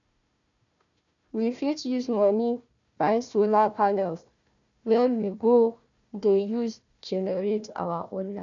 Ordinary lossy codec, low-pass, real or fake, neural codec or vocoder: none; 7.2 kHz; fake; codec, 16 kHz, 1 kbps, FunCodec, trained on Chinese and English, 50 frames a second